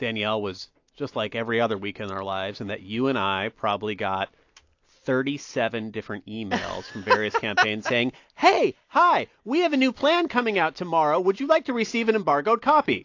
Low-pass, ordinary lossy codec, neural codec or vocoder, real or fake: 7.2 kHz; AAC, 48 kbps; none; real